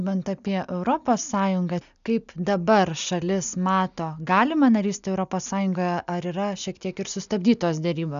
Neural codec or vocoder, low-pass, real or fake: none; 7.2 kHz; real